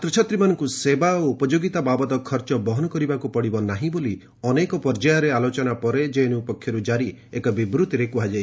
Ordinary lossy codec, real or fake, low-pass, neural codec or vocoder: none; real; none; none